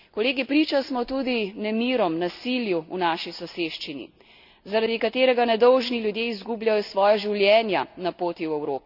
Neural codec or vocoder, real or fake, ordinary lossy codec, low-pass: none; real; none; 5.4 kHz